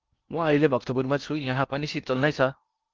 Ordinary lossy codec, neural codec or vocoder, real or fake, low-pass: Opus, 32 kbps; codec, 16 kHz in and 24 kHz out, 0.8 kbps, FocalCodec, streaming, 65536 codes; fake; 7.2 kHz